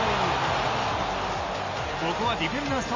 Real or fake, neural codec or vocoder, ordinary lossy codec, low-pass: real; none; MP3, 48 kbps; 7.2 kHz